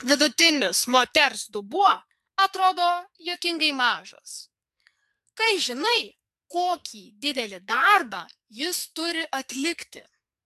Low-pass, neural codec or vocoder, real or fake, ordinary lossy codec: 14.4 kHz; codec, 44.1 kHz, 2.6 kbps, SNAC; fake; MP3, 96 kbps